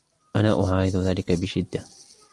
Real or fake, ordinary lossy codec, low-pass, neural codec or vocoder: real; Opus, 32 kbps; 10.8 kHz; none